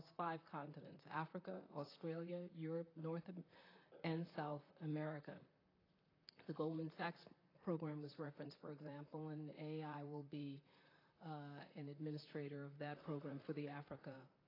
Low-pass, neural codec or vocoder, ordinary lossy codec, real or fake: 5.4 kHz; vocoder, 44.1 kHz, 128 mel bands, Pupu-Vocoder; AAC, 24 kbps; fake